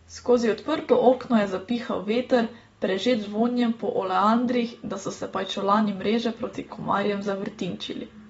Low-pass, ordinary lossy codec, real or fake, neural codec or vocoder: 19.8 kHz; AAC, 24 kbps; real; none